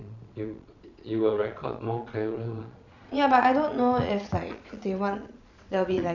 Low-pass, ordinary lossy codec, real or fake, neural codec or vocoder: 7.2 kHz; none; fake; vocoder, 22.05 kHz, 80 mel bands, Vocos